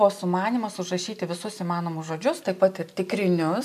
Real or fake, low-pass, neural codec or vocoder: real; 14.4 kHz; none